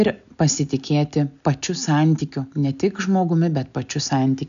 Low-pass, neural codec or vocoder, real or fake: 7.2 kHz; none; real